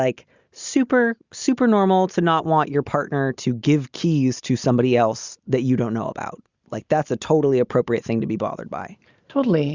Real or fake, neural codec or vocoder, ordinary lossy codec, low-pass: real; none; Opus, 64 kbps; 7.2 kHz